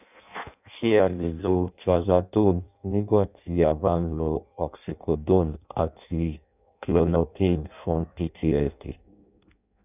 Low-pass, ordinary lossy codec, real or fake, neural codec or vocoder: 3.6 kHz; none; fake; codec, 16 kHz in and 24 kHz out, 0.6 kbps, FireRedTTS-2 codec